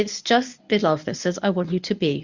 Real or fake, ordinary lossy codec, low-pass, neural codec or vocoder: fake; Opus, 64 kbps; 7.2 kHz; codec, 24 kHz, 0.9 kbps, WavTokenizer, medium speech release version 1